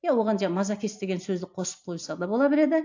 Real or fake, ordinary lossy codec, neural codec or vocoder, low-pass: real; AAC, 48 kbps; none; 7.2 kHz